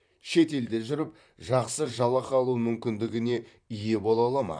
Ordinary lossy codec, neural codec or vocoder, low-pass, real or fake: none; vocoder, 44.1 kHz, 128 mel bands, Pupu-Vocoder; 9.9 kHz; fake